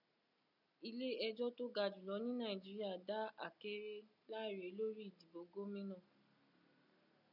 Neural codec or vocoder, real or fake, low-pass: none; real; 5.4 kHz